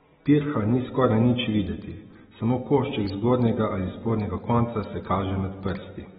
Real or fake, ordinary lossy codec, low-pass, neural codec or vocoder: real; AAC, 16 kbps; 19.8 kHz; none